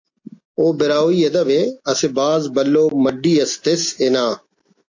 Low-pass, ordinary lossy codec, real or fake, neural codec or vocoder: 7.2 kHz; AAC, 48 kbps; real; none